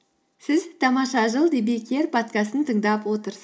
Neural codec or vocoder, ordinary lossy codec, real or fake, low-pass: none; none; real; none